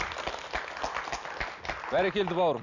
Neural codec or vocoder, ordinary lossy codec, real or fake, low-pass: none; none; real; 7.2 kHz